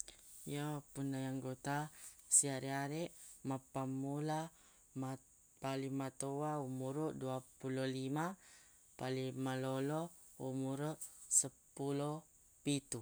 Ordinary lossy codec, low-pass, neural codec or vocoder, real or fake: none; none; none; real